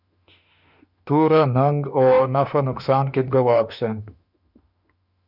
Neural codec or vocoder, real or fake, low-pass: autoencoder, 48 kHz, 32 numbers a frame, DAC-VAE, trained on Japanese speech; fake; 5.4 kHz